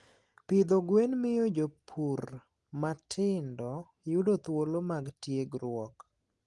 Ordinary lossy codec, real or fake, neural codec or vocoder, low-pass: Opus, 24 kbps; real; none; 10.8 kHz